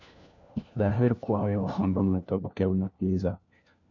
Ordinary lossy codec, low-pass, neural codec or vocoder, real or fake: none; 7.2 kHz; codec, 16 kHz, 1 kbps, FunCodec, trained on LibriTTS, 50 frames a second; fake